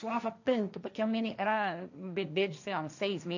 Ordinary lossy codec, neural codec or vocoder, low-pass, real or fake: none; codec, 16 kHz, 1.1 kbps, Voila-Tokenizer; 7.2 kHz; fake